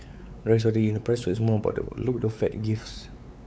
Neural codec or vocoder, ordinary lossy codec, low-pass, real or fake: codec, 16 kHz, 4 kbps, X-Codec, WavLM features, trained on Multilingual LibriSpeech; none; none; fake